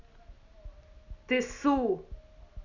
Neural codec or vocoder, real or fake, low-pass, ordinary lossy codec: none; real; 7.2 kHz; none